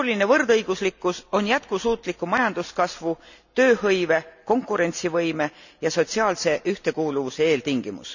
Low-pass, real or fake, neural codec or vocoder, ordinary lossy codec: 7.2 kHz; real; none; none